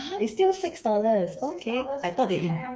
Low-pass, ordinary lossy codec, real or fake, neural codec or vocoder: none; none; fake; codec, 16 kHz, 4 kbps, FreqCodec, smaller model